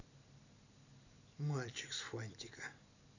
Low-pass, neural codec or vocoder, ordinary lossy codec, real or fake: 7.2 kHz; none; none; real